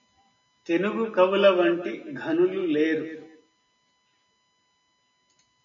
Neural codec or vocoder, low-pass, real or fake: none; 7.2 kHz; real